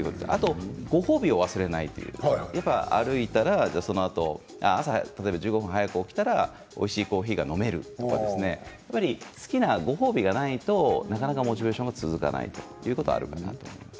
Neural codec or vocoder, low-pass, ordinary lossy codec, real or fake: none; none; none; real